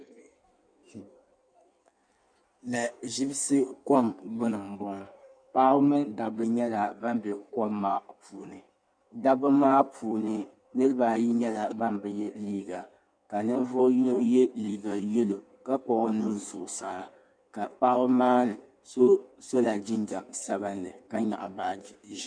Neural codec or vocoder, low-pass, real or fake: codec, 16 kHz in and 24 kHz out, 1.1 kbps, FireRedTTS-2 codec; 9.9 kHz; fake